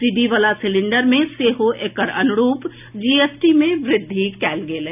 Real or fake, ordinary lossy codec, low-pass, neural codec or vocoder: real; none; 3.6 kHz; none